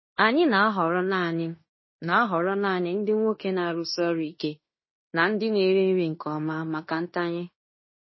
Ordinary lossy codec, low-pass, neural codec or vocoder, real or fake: MP3, 24 kbps; 7.2 kHz; codec, 16 kHz in and 24 kHz out, 0.9 kbps, LongCat-Audio-Codec, fine tuned four codebook decoder; fake